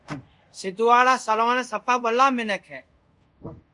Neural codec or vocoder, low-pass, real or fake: codec, 24 kHz, 0.5 kbps, DualCodec; 10.8 kHz; fake